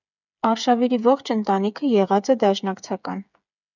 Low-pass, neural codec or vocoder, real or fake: 7.2 kHz; codec, 16 kHz, 8 kbps, FreqCodec, smaller model; fake